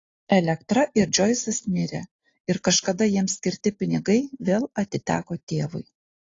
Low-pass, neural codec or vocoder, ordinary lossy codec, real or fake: 7.2 kHz; none; AAC, 32 kbps; real